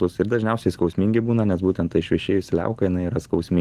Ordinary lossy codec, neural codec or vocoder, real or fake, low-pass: Opus, 24 kbps; none; real; 14.4 kHz